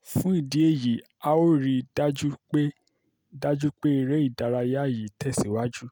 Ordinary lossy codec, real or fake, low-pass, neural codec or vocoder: none; real; none; none